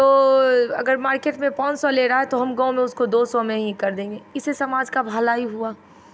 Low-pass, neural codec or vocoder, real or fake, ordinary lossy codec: none; none; real; none